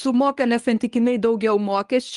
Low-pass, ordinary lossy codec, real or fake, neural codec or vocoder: 10.8 kHz; Opus, 32 kbps; fake; codec, 24 kHz, 0.9 kbps, WavTokenizer, medium speech release version 1